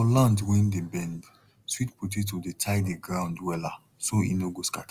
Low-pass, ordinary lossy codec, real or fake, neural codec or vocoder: 14.4 kHz; Opus, 32 kbps; real; none